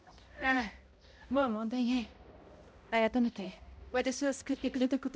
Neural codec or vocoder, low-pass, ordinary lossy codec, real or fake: codec, 16 kHz, 0.5 kbps, X-Codec, HuBERT features, trained on balanced general audio; none; none; fake